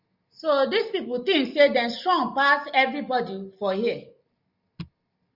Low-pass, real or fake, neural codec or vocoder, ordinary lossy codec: 5.4 kHz; real; none; Opus, 64 kbps